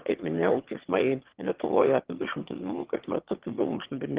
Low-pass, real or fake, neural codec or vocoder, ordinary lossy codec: 3.6 kHz; fake; autoencoder, 22.05 kHz, a latent of 192 numbers a frame, VITS, trained on one speaker; Opus, 16 kbps